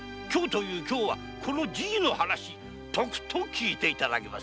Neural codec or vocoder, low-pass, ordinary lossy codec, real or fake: none; none; none; real